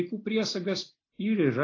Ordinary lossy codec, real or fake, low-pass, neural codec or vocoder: AAC, 32 kbps; real; 7.2 kHz; none